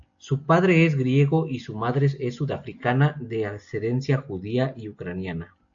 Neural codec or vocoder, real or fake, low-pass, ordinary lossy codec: none; real; 7.2 kHz; AAC, 64 kbps